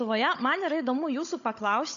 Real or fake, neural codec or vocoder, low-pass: fake; codec, 16 kHz, 16 kbps, FunCodec, trained on Chinese and English, 50 frames a second; 7.2 kHz